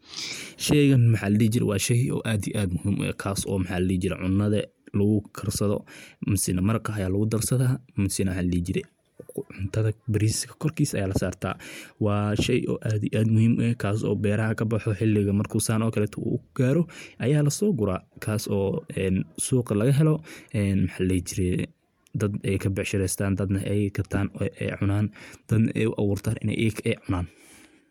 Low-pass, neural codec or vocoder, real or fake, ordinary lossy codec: 19.8 kHz; none; real; MP3, 96 kbps